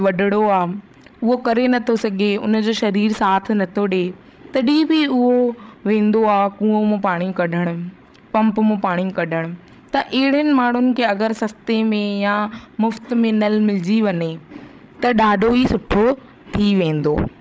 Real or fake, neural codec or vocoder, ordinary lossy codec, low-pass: fake; codec, 16 kHz, 16 kbps, FreqCodec, larger model; none; none